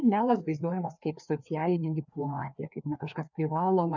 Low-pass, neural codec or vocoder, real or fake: 7.2 kHz; codec, 16 kHz, 2 kbps, FreqCodec, larger model; fake